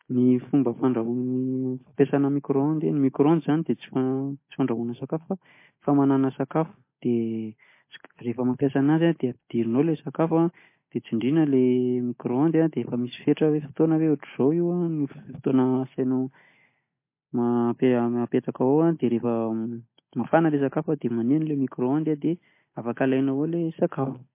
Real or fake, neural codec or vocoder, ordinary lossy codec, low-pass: real; none; MP3, 24 kbps; 3.6 kHz